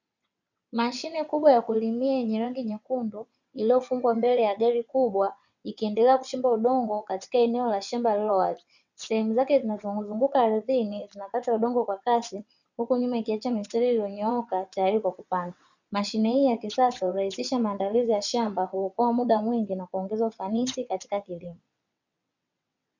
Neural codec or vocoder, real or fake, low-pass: vocoder, 22.05 kHz, 80 mel bands, WaveNeXt; fake; 7.2 kHz